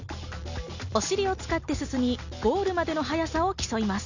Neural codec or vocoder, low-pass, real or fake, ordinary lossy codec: none; 7.2 kHz; real; none